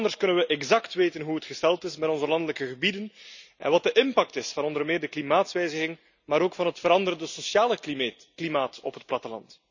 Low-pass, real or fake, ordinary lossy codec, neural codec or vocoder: 7.2 kHz; real; none; none